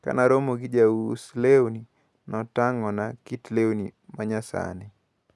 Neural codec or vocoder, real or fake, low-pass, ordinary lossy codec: none; real; none; none